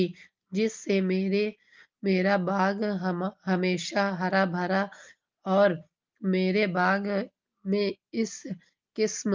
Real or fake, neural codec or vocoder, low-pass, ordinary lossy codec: real; none; 7.2 kHz; Opus, 24 kbps